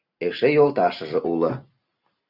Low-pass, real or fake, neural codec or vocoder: 5.4 kHz; real; none